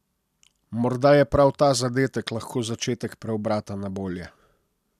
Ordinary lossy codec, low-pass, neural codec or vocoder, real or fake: none; 14.4 kHz; none; real